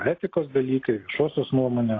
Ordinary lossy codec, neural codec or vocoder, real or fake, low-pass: AAC, 32 kbps; none; real; 7.2 kHz